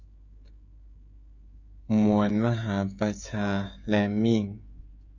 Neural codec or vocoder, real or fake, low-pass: codec, 16 kHz, 16 kbps, FreqCodec, smaller model; fake; 7.2 kHz